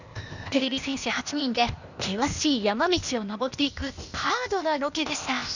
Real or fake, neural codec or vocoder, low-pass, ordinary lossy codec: fake; codec, 16 kHz, 0.8 kbps, ZipCodec; 7.2 kHz; none